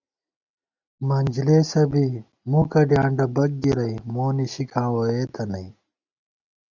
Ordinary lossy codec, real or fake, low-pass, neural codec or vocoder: Opus, 64 kbps; real; 7.2 kHz; none